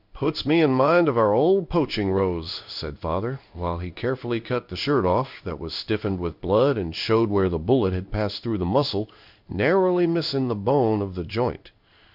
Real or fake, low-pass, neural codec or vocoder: fake; 5.4 kHz; codec, 16 kHz in and 24 kHz out, 1 kbps, XY-Tokenizer